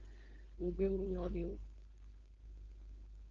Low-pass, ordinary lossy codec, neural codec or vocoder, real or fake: 7.2 kHz; Opus, 24 kbps; codec, 16 kHz, 4.8 kbps, FACodec; fake